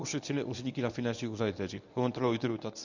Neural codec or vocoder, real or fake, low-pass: codec, 24 kHz, 0.9 kbps, WavTokenizer, medium speech release version 1; fake; 7.2 kHz